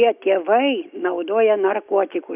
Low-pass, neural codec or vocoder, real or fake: 3.6 kHz; none; real